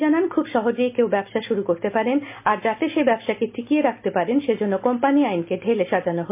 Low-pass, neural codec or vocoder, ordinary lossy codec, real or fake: 3.6 kHz; none; MP3, 32 kbps; real